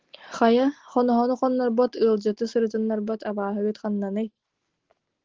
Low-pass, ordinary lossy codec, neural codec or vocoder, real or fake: 7.2 kHz; Opus, 16 kbps; none; real